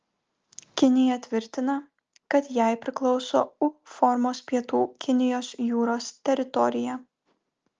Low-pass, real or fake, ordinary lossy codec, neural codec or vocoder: 7.2 kHz; real; Opus, 24 kbps; none